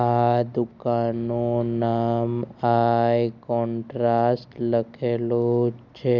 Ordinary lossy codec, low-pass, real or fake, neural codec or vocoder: none; 7.2 kHz; real; none